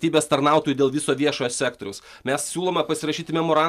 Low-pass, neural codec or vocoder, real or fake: 14.4 kHz; none; real